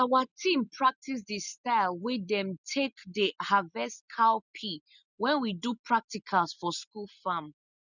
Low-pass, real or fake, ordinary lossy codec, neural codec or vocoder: 7.2 kHz; real; none; none